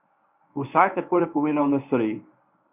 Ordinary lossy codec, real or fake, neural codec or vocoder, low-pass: none; fake; codec, 24 kHz, 0.9 kbps, WavTokenizer, medium speech release version 1; 3.6 kHz